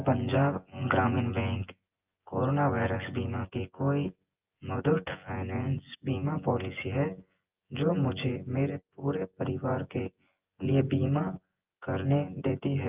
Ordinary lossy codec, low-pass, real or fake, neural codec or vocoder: Opus, 24 kbps; 3.6 kHz; fake; vocoder, 24 kHz, 100 mel bands, Vocos